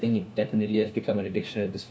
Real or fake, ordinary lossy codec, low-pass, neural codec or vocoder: fake; none; none; codec, 16 kHz, 1 kbps, FunCodec, trained on LibriTTS, 50 frames a second